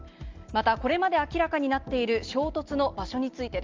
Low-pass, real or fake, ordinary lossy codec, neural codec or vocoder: 7.2 kHz; real; Opus, 32 kbps; none